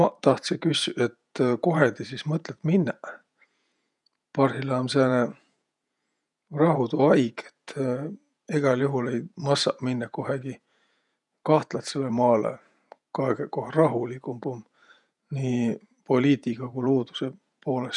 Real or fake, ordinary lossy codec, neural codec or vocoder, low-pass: fake; none; vocoder, 48 kHz, 128 mel bands, Vocos; 10.8 kHz